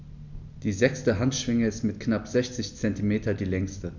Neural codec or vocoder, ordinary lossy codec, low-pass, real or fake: none; none; 7.2 kHz; real